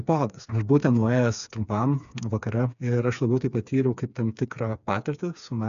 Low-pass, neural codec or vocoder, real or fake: 7.2 kHz; codec, 16 kHz, 4 kbps, FreqCodec, smaller model; fake